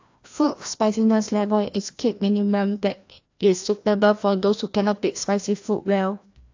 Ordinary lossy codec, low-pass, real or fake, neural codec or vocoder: AAC, 48 kbps; 7.2 kHz; fake; codec, 16 kHz, 1 kbps, FreqCodec, larger model